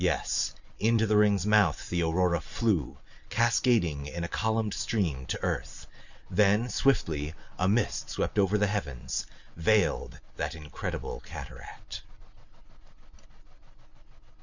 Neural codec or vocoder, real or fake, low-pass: none; real; 7.2 kHz